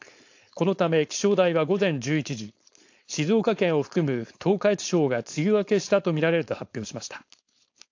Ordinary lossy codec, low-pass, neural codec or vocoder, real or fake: AAC, 48 kbps; 7.2 kHz; codec, 16 kHz, 4.8 kbps, FACodec; fake